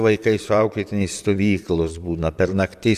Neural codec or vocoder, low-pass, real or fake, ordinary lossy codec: vocoder, 44.1 kHz, 128 mel bands, Pupu-Vocoder; 14.4 kHz; fake; MP3, 96 kbps